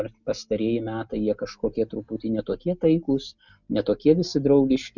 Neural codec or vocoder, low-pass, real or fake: none; 7.2 kHz; real